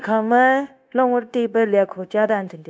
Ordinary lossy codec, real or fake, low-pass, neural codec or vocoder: none; fake; none; codec, 16 kHz, 0.9 kbps, LongCat-Audio-Codec